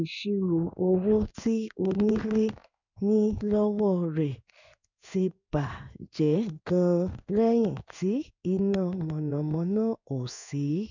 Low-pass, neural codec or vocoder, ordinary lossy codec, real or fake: 7.2 kHz; codec, 16 kHz in and 24 kHz out, 1 kbps, XY-Tokenizer; none; fake